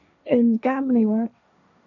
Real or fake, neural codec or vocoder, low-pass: fake; codec, 16 kHz, 1.1 kbps, Voila-Tokenizer; 7.2 kHz